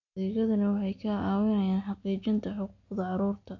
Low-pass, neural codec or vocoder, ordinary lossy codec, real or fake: 7.2 kHz; none; AAC, 48 kbps; real